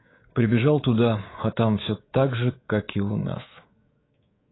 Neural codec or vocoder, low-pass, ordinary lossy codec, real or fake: codec, 24 kHz, 3.1 kbps, DualCodec; 7.2 kHz; AAC, 16 kbps; fake